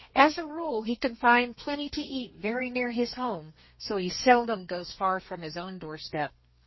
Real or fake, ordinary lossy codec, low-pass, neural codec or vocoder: fake; MP3, 24 kbps; 7.2 kHz; codec, 32 kHz, 1.9 kbps, SNAC